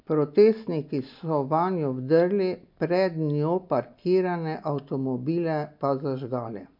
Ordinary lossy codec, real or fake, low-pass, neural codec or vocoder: MP3, 48 kbps; real; 5.4 kHz; none